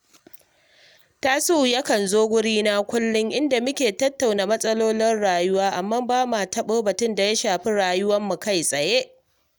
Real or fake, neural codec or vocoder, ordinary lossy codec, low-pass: real; none; none; none